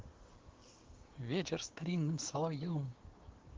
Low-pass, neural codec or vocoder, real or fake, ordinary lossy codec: 7.2 kHz; codec, 16 kHz, 6 kbps, DAC; fake; Opus, 16 kbps